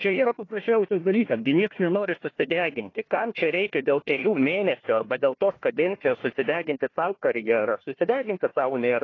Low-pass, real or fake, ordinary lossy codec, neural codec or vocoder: 7.2 kHz; fake; AAC, 32 kbps; codec, 16 kHz, 1 kbps, FunCodec, trained on Chinese and English, 50 frames a second